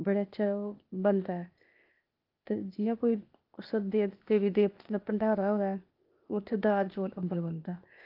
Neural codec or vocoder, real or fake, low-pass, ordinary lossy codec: codec, 16 kHz, 0.8 kbps, ZipCodec; fake; 5.4 kHz; Opus, 24 kbps